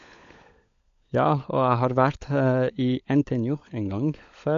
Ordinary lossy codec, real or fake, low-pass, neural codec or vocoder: AAC, 64 kbps; fake; 7.2 kHz; codec, 16 kHz, 16 kbps, FunCodec, trained on LibriTTS, 50 frames a second